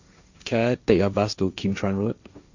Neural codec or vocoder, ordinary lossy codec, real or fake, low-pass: codec, 16 kHz, 1.1 kbps, Voila-Tokenizer; none; fake; 7.2 kHz